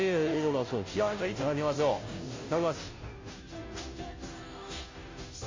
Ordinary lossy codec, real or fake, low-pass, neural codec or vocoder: MP3, 32 kbps; fake; 7.2 kHz; codec, 16 kHz, 0.5 kbps, FunCodec, trained on Chinese and English, 25 frames a second